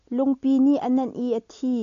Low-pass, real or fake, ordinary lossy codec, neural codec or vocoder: 7.2 kHz; real; AAC, 64 kbps; none